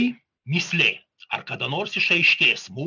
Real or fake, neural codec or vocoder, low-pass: real; none; 7.2 kHz